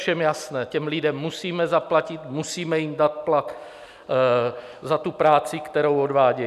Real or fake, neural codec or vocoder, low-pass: real; none; 14.4 kHz